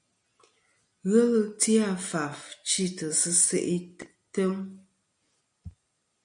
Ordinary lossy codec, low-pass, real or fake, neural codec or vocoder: MP3, 96 kbps; 9.9 kHz; real; none